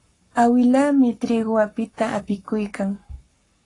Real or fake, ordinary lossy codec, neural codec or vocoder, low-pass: fake; AAC, 32 kbps; codec, 44.1 kHz, 7.8 kbps, Pupu-Codec; 10.8 kHz